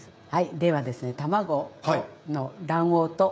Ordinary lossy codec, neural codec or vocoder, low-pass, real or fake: none; codec, 16 kHz, 16 kbps, FreqCodec, smaller model; none; fake